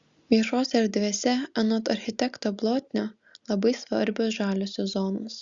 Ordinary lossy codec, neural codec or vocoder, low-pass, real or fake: Opus, 64 kbps; none; 7.2 kHz; real